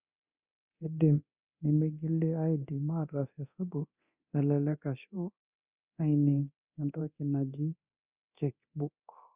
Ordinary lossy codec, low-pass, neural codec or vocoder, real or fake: Opus, 64 kbps; 3.6 kHz; codec, 24 kHz, 0.9 kbps, DualCodec; fake